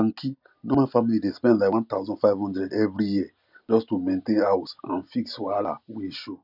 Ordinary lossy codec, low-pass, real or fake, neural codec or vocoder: none; 5.4 kHz; real; none